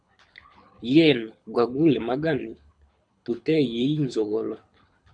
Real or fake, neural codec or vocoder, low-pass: fake; codec, 24 kHz, 6 kbps, HILCodec; 9.9 kHz